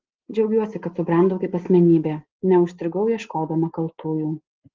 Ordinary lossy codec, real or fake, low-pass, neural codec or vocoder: Opus, 16 kbps; real; 7.2 kHz; none